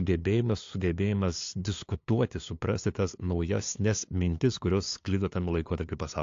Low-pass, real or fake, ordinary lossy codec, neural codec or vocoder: 7.2 kHz; fake; AAC, 48 kbps; codec, 16 kHz, 2 kbps, FunCodec, trained on LibriTTS, 25 frames a second